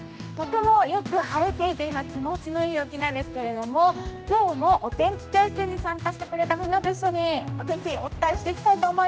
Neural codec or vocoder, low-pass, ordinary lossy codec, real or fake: codec, 16 kHz, 1 kbps, X-Codec, HuBERT features, trained on general audio; none; none; fake